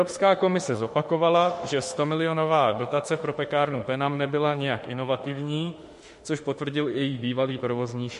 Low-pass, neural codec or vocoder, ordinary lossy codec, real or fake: 14.4 kHz; autoencoder, 48 kHz, 32 numbers a frame, DAC-VAE, trained on Japanese speech; MP3, 48 kbps; fake